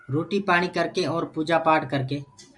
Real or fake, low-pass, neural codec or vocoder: real; 10.8 kHz; none